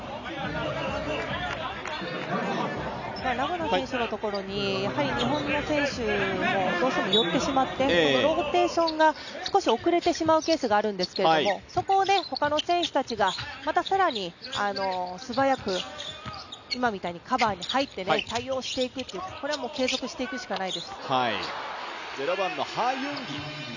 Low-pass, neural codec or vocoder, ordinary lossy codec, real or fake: 7.2 kHz; none; none; real